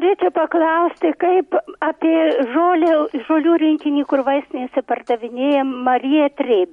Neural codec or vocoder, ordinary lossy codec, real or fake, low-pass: none; MP3, 48 kbps; real; 19.8 kHz